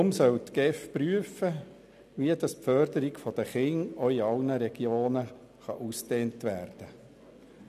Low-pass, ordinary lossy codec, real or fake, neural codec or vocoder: 14.4 kHz; none; real; none